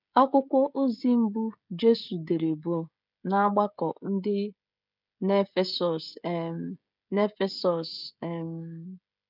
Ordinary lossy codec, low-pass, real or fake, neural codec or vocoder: none; 5.4 kHz; fake; codec, 16 kHz, 16 kbps, FreqCodec, smaller model